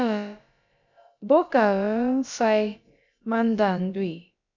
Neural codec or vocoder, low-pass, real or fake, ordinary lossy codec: codec, 16 kHz, about 1 kbps, DyCAST, with the encoder's durations; 7.2 kHz; fake; MP3, 64 kbps